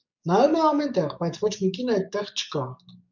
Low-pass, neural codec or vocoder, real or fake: 7.2 kHz; codec, 44.1 kHz, 7.8 kbps, DAC; fake